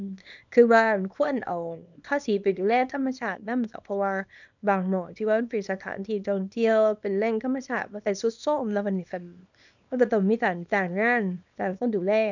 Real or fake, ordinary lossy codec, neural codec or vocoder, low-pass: fake; none; codec, 24 kHz, 0.9 kbps, WavTokenizer, small release; 7.2 kHz